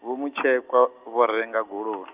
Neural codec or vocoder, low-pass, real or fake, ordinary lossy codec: none; 3.6 kHz; real; Opus, 32 kbps